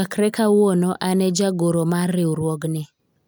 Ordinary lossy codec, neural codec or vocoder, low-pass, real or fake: none; none; none; real